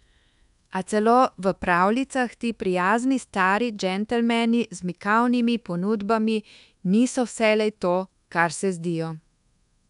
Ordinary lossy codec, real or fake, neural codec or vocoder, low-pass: none; fake; codec, 24 kHz, 1.2 kbps, DualCodec; 10.8 kHz